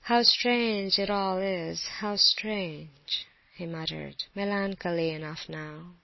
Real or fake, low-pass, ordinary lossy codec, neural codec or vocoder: real; 7.2 kHz; MP3, 24 kbps; none